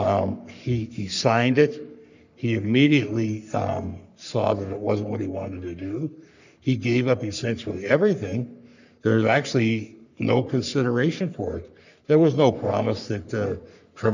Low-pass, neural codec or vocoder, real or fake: 7.2 kHz; codec, 44.1 kHz, 3.4 kbps, Pupu-Codec; fake